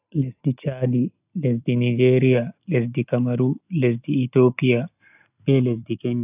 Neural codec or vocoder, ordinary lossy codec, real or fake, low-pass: none; AAC, 32 kbps; real; 3.6 kHz